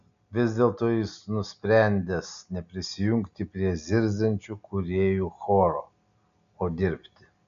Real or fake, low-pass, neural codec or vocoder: real; 7.2 kHz; none